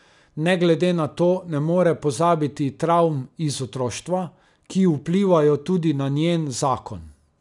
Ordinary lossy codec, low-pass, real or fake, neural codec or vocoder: none; 10.8 kHz; real; none